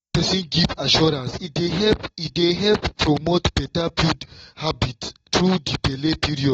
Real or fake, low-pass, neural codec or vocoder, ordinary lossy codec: real; 19.8 kHz; none; AAC, 24 kbps